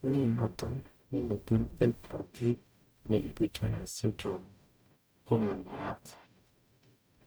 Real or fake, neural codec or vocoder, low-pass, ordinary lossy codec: fake; codec, 44.1 kHz, 0.9 kbps, DAC; none; none